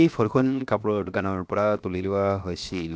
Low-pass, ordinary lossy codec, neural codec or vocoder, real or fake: none; none; codec, 16 kHz, 0.7 kbps, FocalCodec; fake